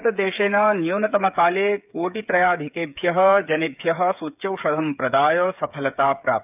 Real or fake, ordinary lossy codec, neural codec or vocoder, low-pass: fake; none; codec, 16 kHz, 8 kbps, FreqCodec, smaller model; 3.6 kHz